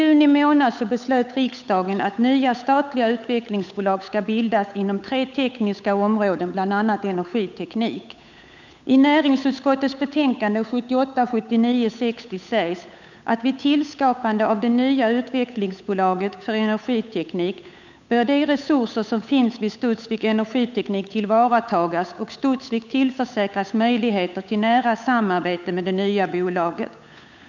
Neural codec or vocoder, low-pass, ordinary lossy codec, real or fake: codec, 16 kHz, 8 kbps, FunCodec, trained on Chinese and English, 25 frames a second; 7.2 kHz; none; fake